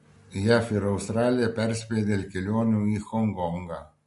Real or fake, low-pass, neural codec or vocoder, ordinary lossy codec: real; 14.4 kHz; none; MP3, 48 kbps